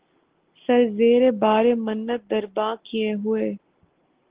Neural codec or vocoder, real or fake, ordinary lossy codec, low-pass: none; real; Opus, 16 kbps; 3.6 kHz